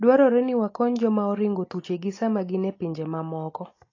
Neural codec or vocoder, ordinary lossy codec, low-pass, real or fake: none; AAC, 32 kbps; 7.2 kHz; real